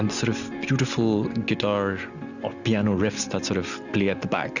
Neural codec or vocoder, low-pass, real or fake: none; 7.2 kHz; real